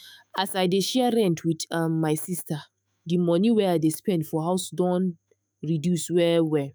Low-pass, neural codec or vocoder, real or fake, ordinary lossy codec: none; autoencoder, 48 kHz, 128 numbers a frame, DAC-VAE, trained on Japanese speech; fake; none